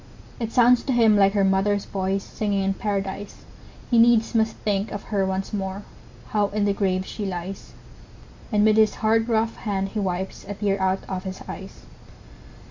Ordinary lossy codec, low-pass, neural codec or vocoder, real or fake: MP3, 48 kbps; 7.2 kHz; none; real